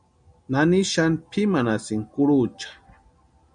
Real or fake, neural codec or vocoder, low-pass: real; none; 9.9 kHz